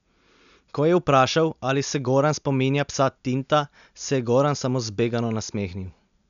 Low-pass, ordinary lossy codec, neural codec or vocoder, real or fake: 7.2 kHz; none; none; real